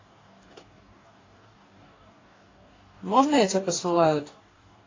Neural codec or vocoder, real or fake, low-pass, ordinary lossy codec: codec, 44.1 kHz, 2.6 kbps, DAC; fake; 7.2 kHz; AAC, 32 kbps